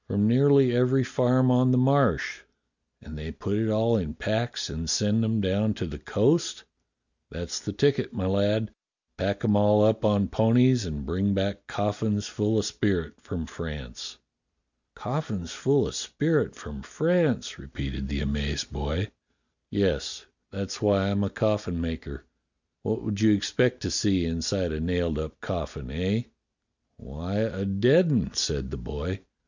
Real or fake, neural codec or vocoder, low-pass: real; none; 7.2 kHz